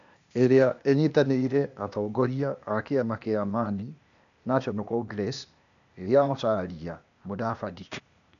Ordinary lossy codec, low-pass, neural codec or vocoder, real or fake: MP3, 96 kbps; 7.2 kHz; codec, 16 kHz, 0.8 kbps, ZipCodec; fake